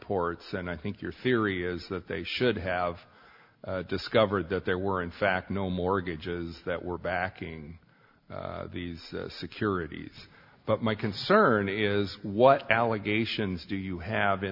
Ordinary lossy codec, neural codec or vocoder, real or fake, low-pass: MP3, 48 kbps; none; real; 5.4 kHz